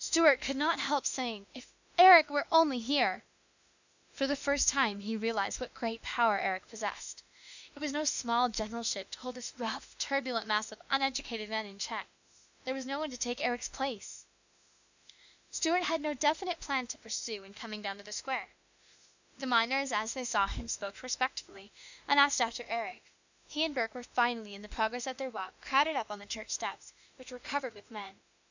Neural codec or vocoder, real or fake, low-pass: autoencoder, 48 kHz, 32 numbers a frame, DAC-VAE, trained on Japanese speech; fake; 7.2 kHz